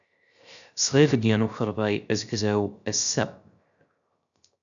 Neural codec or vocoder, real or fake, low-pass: codec, 16 kHz, 0.3 kbps, FocalCodec; fake; 7.2 kHz